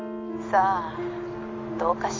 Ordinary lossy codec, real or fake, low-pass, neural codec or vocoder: AAC, 32 kbps; real; 7.2 kHz; none